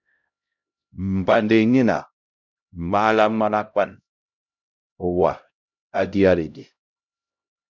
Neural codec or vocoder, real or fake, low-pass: codec, 16 kHz, 0.5 kbps, X-Codec, HuBERT features, trained on LibriSpeech; fake; 7.2 kHz